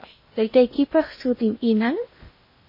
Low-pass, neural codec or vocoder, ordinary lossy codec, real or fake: 5.4 kHz; codec, 16 kHz in and 24 kHz out, 0.6 kbps, FocalCodec, streaming, 2048 codes; MP3, 24 kbps; fake